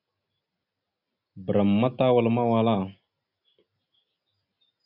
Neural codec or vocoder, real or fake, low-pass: none; real; 5.4 kHz